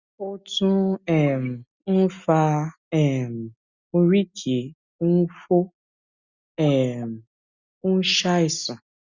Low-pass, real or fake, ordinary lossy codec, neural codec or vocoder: 7.2 kHz; real; Opus, 64 kbps; none